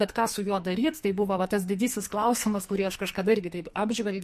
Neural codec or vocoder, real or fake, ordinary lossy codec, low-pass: codec, 44.1 kHz, 2.6 kbps, SNAC; fake; MP3, 64 kbps; 14.4 kHz